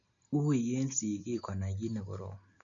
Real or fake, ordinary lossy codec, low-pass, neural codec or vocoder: real; none; 7.2 kHz; none